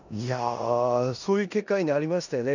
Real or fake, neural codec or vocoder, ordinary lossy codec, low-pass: fake; codec, 16 kHz in and 24 kHz out, 0.9 kbps, LongCat-Audio-Codec, four codebook decoder; none; 7.2 kHz